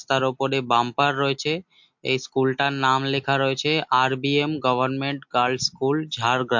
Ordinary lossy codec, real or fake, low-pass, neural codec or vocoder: MP3, 48 kbps; real; 7.2 kHz; none